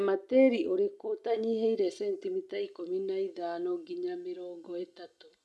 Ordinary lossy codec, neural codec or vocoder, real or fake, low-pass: none; none; real; none